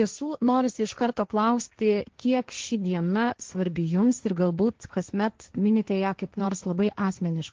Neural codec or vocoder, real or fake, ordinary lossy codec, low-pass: codec, 16 kHz, 1.1 kbps, Voila-Tokenizer; fake; Opus, 16 kbps; 7.2 kHz